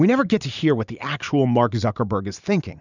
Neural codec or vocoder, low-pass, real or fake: vocoder, 44.1 kHz, 128 mel bands every 512 samples, BigVGAN v2; 7.2 kHz; fake